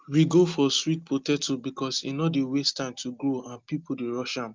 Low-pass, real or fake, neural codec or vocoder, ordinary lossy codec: 7.2 kHz; real; none; Opus, 32 kbps